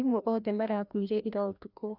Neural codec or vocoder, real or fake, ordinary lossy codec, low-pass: codec, 16 kHz, 1 kbps, FreqCodec, larger model; fake; none; 5.4 kHz